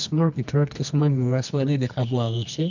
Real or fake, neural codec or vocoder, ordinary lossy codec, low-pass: fake; codec, 24 kHz, 0.9 kbps, WavTokenizer, medium music audio release; none; 7.2 kHz